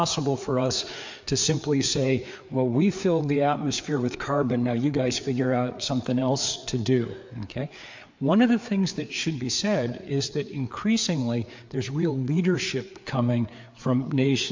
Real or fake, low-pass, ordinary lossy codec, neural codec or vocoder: fake; 7.2 kHz; MP3, 48 kbps; codec, 16 kHz, 4 kbps, FreqCodec, larger model